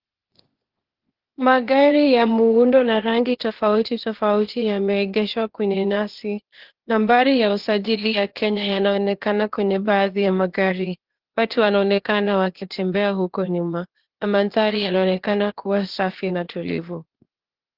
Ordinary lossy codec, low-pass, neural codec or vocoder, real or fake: Opus, 16 kbps; 5.4 kHz; codec, 16 kHz, 0.8 kbps, ZipCodec; fake